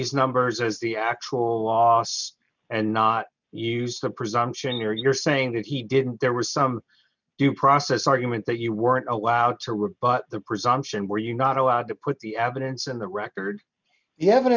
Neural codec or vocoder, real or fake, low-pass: none; real; 7.2 kHz